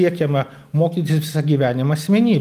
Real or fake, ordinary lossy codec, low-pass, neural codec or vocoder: real; Opus, 32 kbps; 14.4 kHz; none